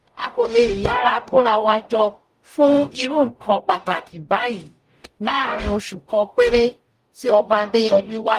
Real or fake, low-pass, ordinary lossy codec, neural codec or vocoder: fake; 14.4 kHz; Opus, 32 kbps; codec, 44.1 kHz, 0.9 kbps, DAC